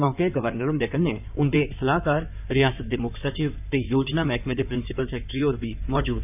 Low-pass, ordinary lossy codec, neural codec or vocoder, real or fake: 3.6 kHz; none; codec, 16 kHz in and 24 kHz out, 2.2 kbps, FireRedTTS-2 codec; fake